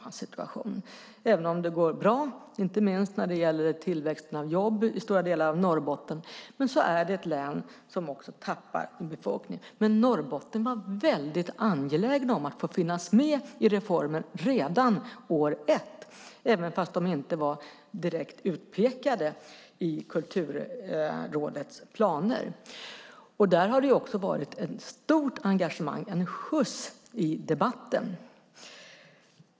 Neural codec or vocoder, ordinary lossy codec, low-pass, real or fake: none; none; none; real